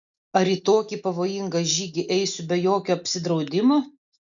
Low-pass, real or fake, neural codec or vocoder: 7.2 kHz; real; none